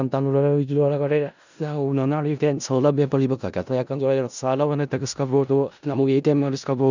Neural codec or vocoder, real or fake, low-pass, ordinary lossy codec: codec, 16 kHz in and 24 kHz out, 0.4 kbps, LongCat-Audio-Codec, four codebook decoder; fake; 7.2 kHz; none